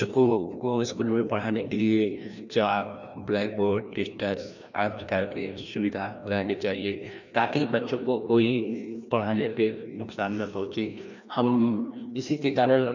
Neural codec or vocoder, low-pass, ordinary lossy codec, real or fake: codec, 16 kHz, 1 kbps, FreqCodec, larger model; 7.2 kHz; none; fake